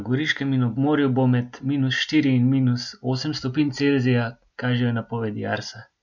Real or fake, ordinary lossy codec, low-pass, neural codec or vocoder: real; none; 7.2 kHz; none